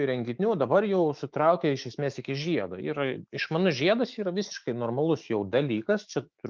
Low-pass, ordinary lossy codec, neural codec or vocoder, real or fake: 7.2 kHz; Opus, 32 kbps; autoencoder, 48 kHz, 128 numbers a frame, DAC-VAE, trained on Japanese speech; fake